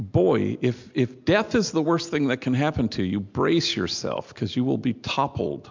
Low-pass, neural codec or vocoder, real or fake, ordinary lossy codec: 7.2 kHz; none; real; MP3, 64 kbps